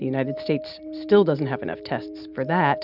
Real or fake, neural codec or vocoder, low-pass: real; none; 5.4 kHz